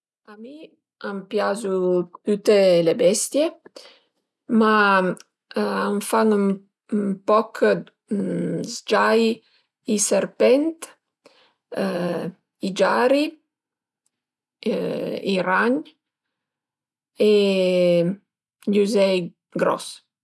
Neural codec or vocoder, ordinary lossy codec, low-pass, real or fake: none; none; none; real